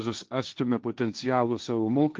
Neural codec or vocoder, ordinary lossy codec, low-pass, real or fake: codec, 16 kHz, 1.1 kbps, Voila-Tokenizer; Opus, 24 kbps; 7.2 kHz; fake